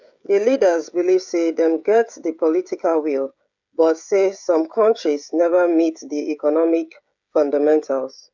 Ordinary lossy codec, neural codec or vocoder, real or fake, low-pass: none; codec, 16 kHz, 16 kbps, FreqCodec, smaller model; fake; 7.2 kHz